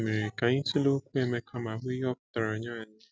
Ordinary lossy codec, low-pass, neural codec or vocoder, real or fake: none; none; none; real